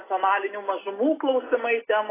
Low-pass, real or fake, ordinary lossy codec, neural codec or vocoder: 3.6 kHz; real; AAC, 16 kbps; none